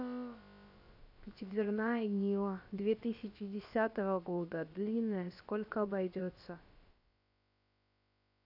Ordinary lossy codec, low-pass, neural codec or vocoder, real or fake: AAC, 48 kbps; 5.4 kHz; codec, 16 kHz, about 1 kbps, DyCAST, with the encoder's durations; fake